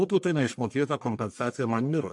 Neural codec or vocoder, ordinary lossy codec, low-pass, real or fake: codec, 44.1 kHz, 1.7 kbps, Pupu-Codec; AAC, 64 kbps; 10.8 kHz; fake